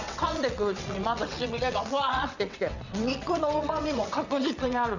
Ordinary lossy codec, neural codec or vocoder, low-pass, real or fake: none; vocoder, 22.05 kHz, 80 mel bands, WaveNeXt; 7.2 kHz; fake